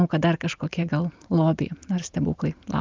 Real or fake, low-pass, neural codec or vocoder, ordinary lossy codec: real; 7.2 kHz; none; Opus, 24 kbps